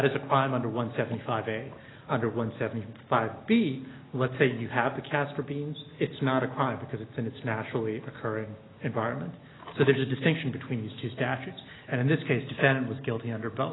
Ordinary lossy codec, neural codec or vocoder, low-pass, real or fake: AAC, 16 kbps; none; 7.2 kHz; real